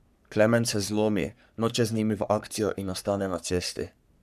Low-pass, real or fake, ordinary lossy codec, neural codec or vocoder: 14.4 kHz; fake; none; codec, 44.1 kHz, 3.4 kbps, Pupu-Codec